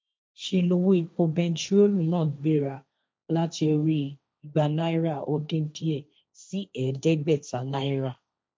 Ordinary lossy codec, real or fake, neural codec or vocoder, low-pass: none; fake; codec, 16 kHz, 1.1 kbps, Voila-Tokenizer; none